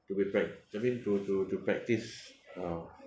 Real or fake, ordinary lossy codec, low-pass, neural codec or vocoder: real; none; none; none